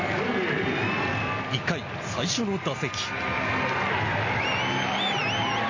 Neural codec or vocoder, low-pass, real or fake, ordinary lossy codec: none; 7.2 kHz; real; MP3, 32 kbps